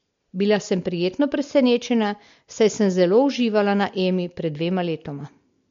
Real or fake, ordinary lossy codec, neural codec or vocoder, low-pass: real; MP3, 48 kbps; none; 7.2 kHz